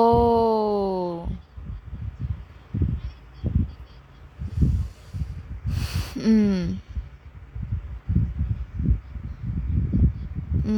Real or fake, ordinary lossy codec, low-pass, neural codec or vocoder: real; Opus, 64 kbps; 19.8 kHz; none